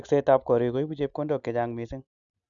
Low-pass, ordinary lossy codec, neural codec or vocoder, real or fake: 7.2 kHz; none; none; real